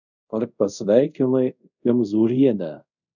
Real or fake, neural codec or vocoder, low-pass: fake; codec, 24 kHz, 0.5 kbps, DualCodec; 7.2 kHz